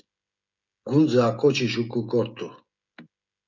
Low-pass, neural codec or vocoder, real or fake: 7.2 kHz; codec, 16 kHz, 16 kbps, FreqCodec, smaller model; fake